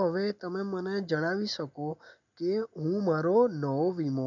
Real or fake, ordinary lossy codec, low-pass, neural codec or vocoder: real; none; 7.2 kHz; none